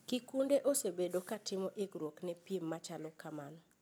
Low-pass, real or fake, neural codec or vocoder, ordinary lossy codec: none; real; none; none